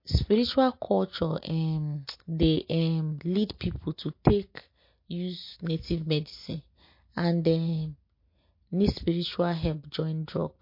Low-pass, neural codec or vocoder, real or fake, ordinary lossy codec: 5.4 kHz; none; real; MP3, 32 kbps